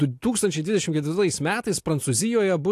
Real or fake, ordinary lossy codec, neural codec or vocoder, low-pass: real; AAC, 64 kbps; none; 14.4 kHz